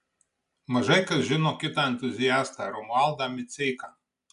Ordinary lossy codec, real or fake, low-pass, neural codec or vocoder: MP3, 96 kbps; real; 10.8 kHz; none